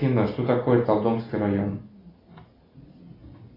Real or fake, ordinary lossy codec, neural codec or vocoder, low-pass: real; AAC, 24 kbps; none; 5.4 kHz